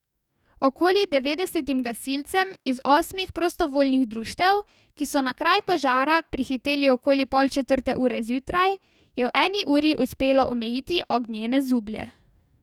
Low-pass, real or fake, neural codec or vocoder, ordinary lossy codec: 19.8 kHz; fake; codec, 44.1 kHz, 2.6 kbps, DAC; none